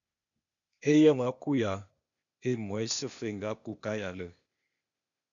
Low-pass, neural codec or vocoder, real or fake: 7.2 kHz; codec, 16 kHz, 0.8 kbps, ZipCodec; fake